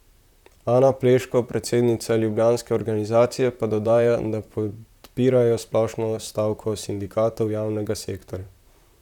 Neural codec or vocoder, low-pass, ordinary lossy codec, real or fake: vocoder, 44.1 kHz, 128 mel bands, Pupu-Vocoder; 19.8 kHz; none; fake